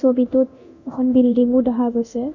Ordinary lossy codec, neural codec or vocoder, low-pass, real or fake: none; codec, 24 kHz, 0.9 kbps, DualCodec; 7.2 kHz; fake